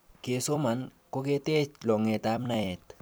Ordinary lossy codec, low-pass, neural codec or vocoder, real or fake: none; none; vocoder, 44.1 kHz, 128 mel bands every 256 samples, BigVGAN v2; fake